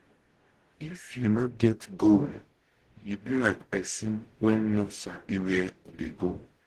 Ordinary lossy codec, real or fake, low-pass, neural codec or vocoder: Opus, 16 kbps; fake; 14.4 kHz; codec, 44.1 kHz, 0.9 kbps, DAC